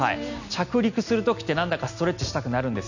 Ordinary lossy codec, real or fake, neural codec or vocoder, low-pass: none; real; none; 7.2 kHz